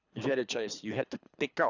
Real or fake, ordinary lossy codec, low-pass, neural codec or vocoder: fake; none; 7.2 kHz; codec, 24 kHz, 3 kbps, HILCodec